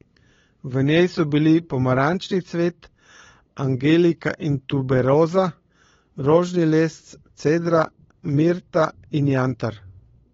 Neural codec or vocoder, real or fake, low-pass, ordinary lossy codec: codec, 16 kHz, 8 kbps, FunCodec, trained on LibriTTS, 25 frames a second; fake; 7.2 kHz; AAC, 24 kbps